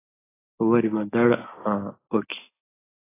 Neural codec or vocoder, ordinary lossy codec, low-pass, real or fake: none; AAC, 16 kbps; 3.6 kHz; real